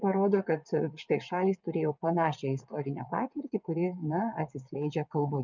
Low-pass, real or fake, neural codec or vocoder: 7.2 kHz; fake; vocoder, 22.05 kHz, 80 mel bands, WaveNeXt